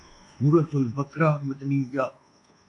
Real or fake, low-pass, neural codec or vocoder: fake; 10.8 kHz; codec, 24 kHz, 1.2 kbps, DualCodec